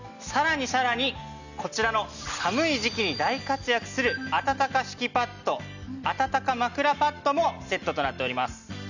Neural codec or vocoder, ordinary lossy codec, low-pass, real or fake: none; none; 7.2 kHz; real